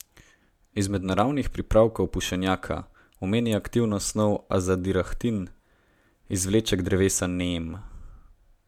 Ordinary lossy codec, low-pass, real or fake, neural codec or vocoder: MP3, 96 kbps; 19.8 kHz; real; none